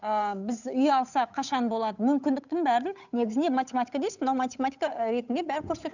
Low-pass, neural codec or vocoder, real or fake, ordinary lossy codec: 7.2 kHz; codec, 16 kHz, 8 kbps, FunCodec, trained on Chinese and English, 25 frames a second; fake; none